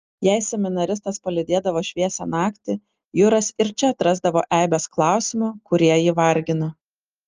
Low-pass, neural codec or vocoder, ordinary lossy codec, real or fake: 7.2 kHz; none; Opus, 24 kbps; real